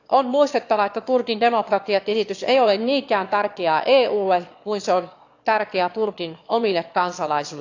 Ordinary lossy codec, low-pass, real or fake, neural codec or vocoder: AAC, 48 kbps; 7.2 kHz; fake; autoencoder, 22.05 kHz, a latent of 192 numbers a frame, VITS, trained on one speaker